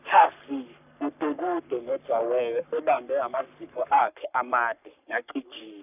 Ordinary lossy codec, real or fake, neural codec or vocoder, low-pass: none; fake; codec, 44.1 kHz, 3.4 kbps, Pupu-Codec; 3.6 kHz